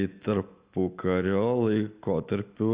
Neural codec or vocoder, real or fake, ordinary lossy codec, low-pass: none; real; Opus, 64 kbps; 3.6 kHz